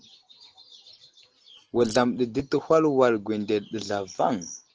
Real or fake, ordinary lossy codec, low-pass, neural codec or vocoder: real; Opus, 16 kbps; 7.2 kHz; none